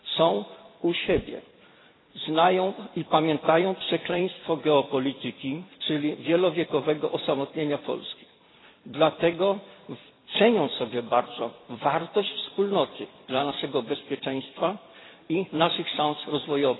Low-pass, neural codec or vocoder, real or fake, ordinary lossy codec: 7.2 kHz; vocoder, 44.1 kHz, 128 mel bands every 256 samples, BigVGAN v2; fake; AAC, 16 kbps